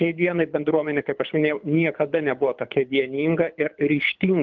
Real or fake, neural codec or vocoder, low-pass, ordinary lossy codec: fake; codec, 24 kHz, 6 kbps, HILCodec; 7.2 kHz; Opus, 32 kbps